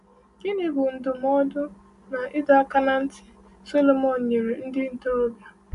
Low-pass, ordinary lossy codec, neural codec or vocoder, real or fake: 10.8 kHz; none; none; real